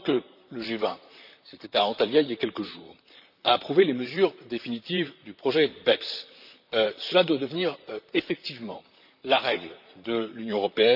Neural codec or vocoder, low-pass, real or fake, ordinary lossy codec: vocoder, 44.1 kHz, 128 mel bands, Pupu-Vocoder; 5.4 kHz; fake; none